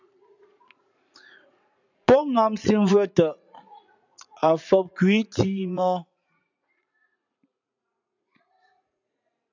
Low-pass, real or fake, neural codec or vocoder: 7.2 kHz; fake; vocoder, 44.1 kHz, 80 mel bands, Vocos